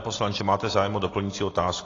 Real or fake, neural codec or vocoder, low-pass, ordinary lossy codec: real; none; 7.2 kHz; AAC, 32 kbps